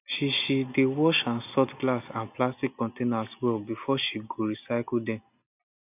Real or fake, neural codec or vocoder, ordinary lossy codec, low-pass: real; none; none; 3.6 kHz